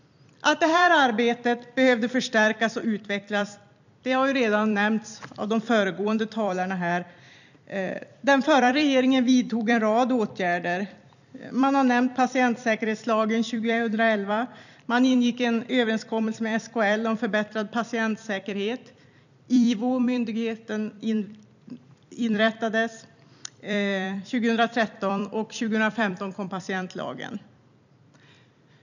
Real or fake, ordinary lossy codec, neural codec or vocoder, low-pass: fake; none; vocoder, 44.1 kHz, 128 mel bands every 256 samples, BigVGAN v2; 7.2 kHz